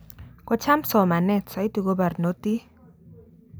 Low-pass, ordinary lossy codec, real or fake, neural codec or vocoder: none; none; real; none